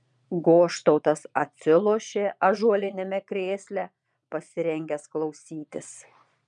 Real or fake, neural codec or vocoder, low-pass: fake; vocoder, 22.05 kHz, 80 mel bands, Vocos; 9.9 kHz